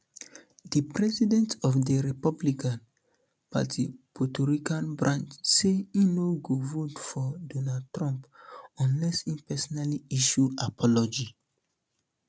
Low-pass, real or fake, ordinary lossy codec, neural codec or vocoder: none; real; none; none